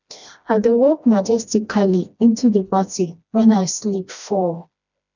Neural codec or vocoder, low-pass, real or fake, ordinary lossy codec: codec, 16 kHz, 1 kbps, FreqCodec, smaller model; 7.2 kHz; fake; none